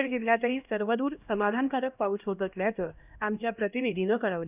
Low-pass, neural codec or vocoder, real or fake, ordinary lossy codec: 3.6 kHz; codec, 16 kHz, 1 kbps, X-Codec, HuBERT features, trained on LibriSpeech; fake; none